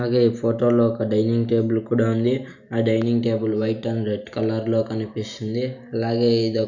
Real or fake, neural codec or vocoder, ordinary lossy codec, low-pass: real; none; none; 7.2 kHz